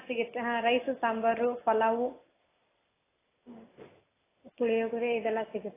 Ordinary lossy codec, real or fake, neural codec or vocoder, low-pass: AAC, 16 kbps; real; none; 3.6 kHz